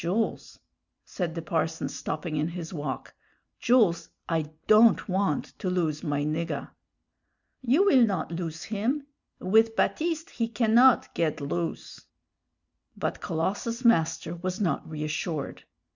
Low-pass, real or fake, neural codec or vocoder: 7.2 kHz; real; none